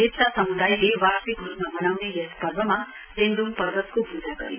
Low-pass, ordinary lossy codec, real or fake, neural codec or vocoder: 3.6 kHz; none; real; none